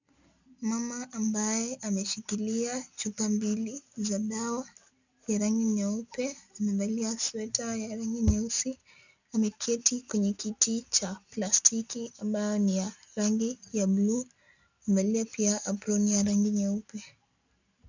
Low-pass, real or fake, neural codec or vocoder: 7.2 kHz; real; none